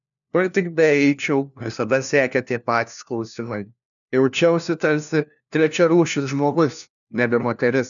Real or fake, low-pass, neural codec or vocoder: fake; 7.2 kHz; codec, 16 kHz, 1 kbps, FunCodec, trained on LibriTTS, 50 frames a second